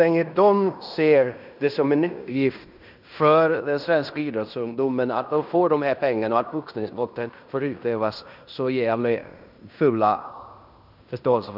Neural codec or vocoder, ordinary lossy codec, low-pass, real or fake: codec, 16 kHz in and 24 kHz out, 0.9 kbps, LongCat-Audio-Codec, fine tuned four codebook decoder; none; 5.4 kHz; fake